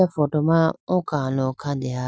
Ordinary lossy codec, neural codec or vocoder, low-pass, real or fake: none; none; none; real